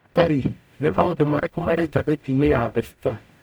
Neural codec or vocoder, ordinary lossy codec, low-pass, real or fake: codec, 44.1 kHz, 0.9 kbps, DAC; none; none; fake